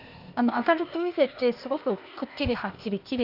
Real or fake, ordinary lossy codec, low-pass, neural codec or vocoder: fake; Opus, 64 kbps; 5.4 kHz; codec, 16 kHz, 0.8 kbps, ZipCodec